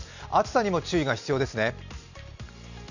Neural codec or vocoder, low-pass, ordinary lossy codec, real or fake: none; 7.2 kHz; none; real